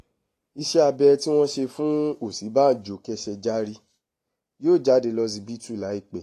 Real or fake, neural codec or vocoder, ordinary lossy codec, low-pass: real; none; AAC, 48 kbps; 14.4 kHz